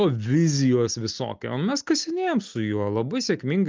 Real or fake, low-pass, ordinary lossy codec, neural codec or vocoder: real; 7.2 kHz; Opus, 24 kbps; none